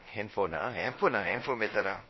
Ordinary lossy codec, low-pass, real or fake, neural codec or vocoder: MP3, 24 kbps; 7.2 kHz; fake; codec, 16 kHz, about 1 kbps, DyCAST, with the encoder's durations